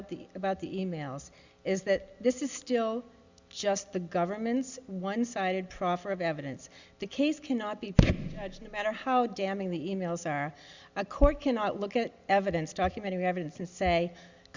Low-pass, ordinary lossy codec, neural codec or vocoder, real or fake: 7.2 kHz; Opus, 64 kbps; none; real